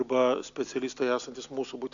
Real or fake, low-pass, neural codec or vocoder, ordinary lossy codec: real; 7.2 kHz; none; AAC, 64 kbps